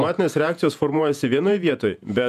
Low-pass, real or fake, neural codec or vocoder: 14.4 kHz; fake; vocoder, 48 kHz, 128 mel bands, Vocos